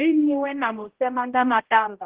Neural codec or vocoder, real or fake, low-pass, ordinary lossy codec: codec, 16 kHz, 0.5 kbps, X-Codec, HuBERT features, trained on general audio; fake; 3.6 kHz; Opus, 16 kbps